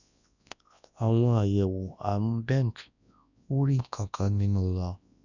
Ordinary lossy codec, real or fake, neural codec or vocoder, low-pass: none; fake; codec, 24 kHz, 0.9 kbps, WavTokenizer, large speech release; 7.2 kHz